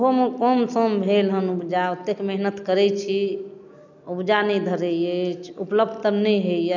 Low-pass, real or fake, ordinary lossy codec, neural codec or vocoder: 7.2 kHz; real; none; none